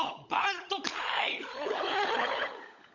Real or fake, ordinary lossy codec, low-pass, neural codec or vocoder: fake; none; 7.2 kHz; codec, 16 kHz, 16 kbps, FunCodec, trained on LibriTTS, 50 frames a second